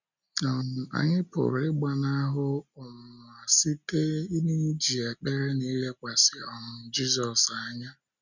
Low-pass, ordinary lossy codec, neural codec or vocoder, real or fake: 7.2 kHz; none; none; real